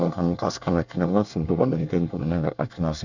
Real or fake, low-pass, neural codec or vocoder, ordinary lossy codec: fake; 7.2 kHz; codec, 24 kHz, 1 kbps, SNAC; none